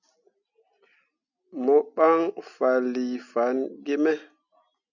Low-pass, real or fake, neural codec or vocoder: 7.2 kHz; real; none